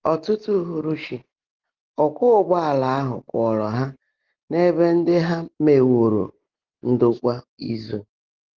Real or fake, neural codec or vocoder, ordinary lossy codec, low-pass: real; none; Opus, 16 kbps; 7.2 kHz